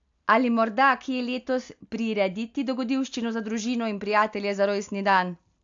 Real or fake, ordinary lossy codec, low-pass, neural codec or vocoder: real; AAC, 64 kbps; 7.2 kHz; none